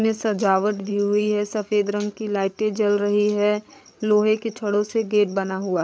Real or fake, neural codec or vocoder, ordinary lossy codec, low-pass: fake; codec, 16 kHz, 8 kbps, FreqCodec, larger model; none; none